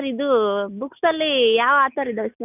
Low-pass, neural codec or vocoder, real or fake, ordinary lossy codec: 3.6 kHz; none; real; none